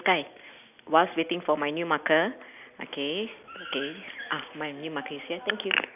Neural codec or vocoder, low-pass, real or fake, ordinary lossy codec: none; 3.6 kHz; real; none